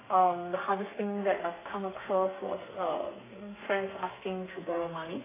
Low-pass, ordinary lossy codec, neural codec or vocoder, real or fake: 3.6 kHz; AAC, 16 kbps; codec, 32 kHz, 1.9 kbps, SNAC; fake